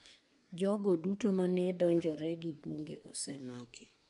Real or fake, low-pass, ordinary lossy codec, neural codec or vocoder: fake; 10.8 kHz; none; codec, 24 kHz, 1 kbps, SNAC